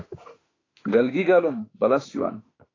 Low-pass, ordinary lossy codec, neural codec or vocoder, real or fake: 7.2 kHz; AAC, 32 kbps; autoencoder, 48 kHz, 32 numbers a frame, DAC-VAE, trained on Japanese speech; fake